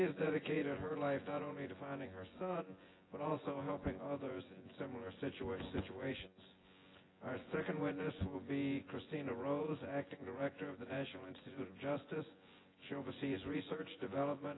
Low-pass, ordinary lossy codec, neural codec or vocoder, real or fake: 7.2 kHz; AAC, 16 kbps; vocoder, 24 kHz, 100 mel bands, Vocos; fake